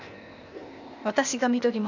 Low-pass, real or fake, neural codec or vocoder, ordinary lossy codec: 7.2 kHz; fake; codec, 16 kHz, 0.8 kbps, ZipCodec; none